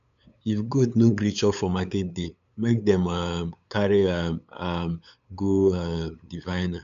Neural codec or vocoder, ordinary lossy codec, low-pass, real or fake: codec, 16 kHz, 8 kbps, FunCodec, trained on LibriTTS, 25 frames a second; none; 7.2 kHz; fake